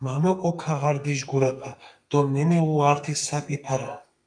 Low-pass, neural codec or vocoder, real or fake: 9.9 kHz; codec, 32 kHz, 1.9 kbps, SNAC; fake